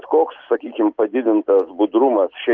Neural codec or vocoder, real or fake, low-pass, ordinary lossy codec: none; real; 7.2 kHz; Opus, 24 kbps